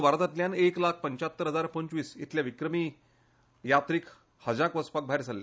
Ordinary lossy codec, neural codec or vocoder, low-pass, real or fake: none; none; none; real